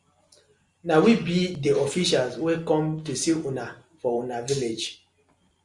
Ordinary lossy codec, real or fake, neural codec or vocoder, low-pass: Opus, 64 kbps; real; none; 10.8 kHz